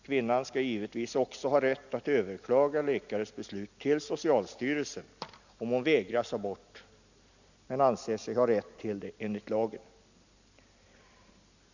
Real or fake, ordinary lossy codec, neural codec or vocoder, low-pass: real; none; none; 7.2 kHz